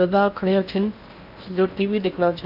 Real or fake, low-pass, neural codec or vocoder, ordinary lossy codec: fake; 5.4 kHz; codec, 16 kHz in and 24 kHz out, 0.8 kbps, FocalCodec, streaming, 65536 codes; none